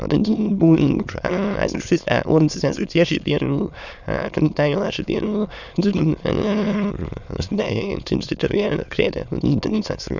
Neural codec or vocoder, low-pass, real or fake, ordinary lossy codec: autoencoder, 22.05 kHz, a latent of 192 numbers a frame, VITS, trained on many speakers; 7.2 kHz; fake; none